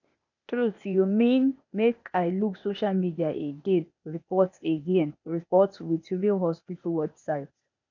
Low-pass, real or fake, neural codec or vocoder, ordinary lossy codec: 7.2 kHz; fake; codec, 16 kHz, 0.8 kbps, ZipCodec; none